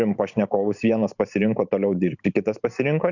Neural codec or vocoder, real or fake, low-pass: vocoder, 44.1 kHz, 128 mel bands every 512 samples, BigVGAN v2; fake; 7.2 kHz